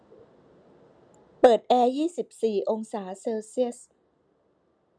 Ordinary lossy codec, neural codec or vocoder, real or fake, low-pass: none; none; real; 9.9 kHz